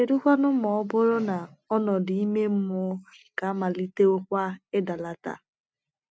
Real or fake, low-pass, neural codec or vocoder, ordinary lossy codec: real; none; none; none